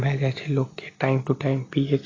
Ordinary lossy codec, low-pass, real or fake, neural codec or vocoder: AAC, 32 kbps; 7.2 kHz; real; none